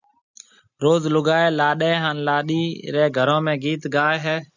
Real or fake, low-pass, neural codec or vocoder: real; 7.2 kHz; none